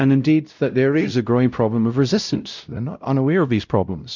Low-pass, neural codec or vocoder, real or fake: 7.2 kHz; codec, 16 kHz, 0.5 kbps, X-Codec, WavLM features, trained on Multilingual LibriSpeech; fake